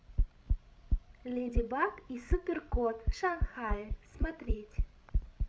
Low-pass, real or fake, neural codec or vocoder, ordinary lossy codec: none; fake; codec, 16 kHz, 16 kbps, FreqCodec, larger model; none